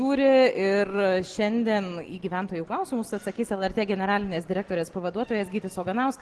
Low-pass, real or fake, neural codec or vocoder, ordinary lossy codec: 10.8 kHz; real; none; Opus, 16 kbps